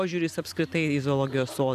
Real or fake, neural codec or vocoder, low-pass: fake; vocoder, 44.1 kHz, 128 mel bands every 256 samples, BigVGAN v2; 14.4 kHz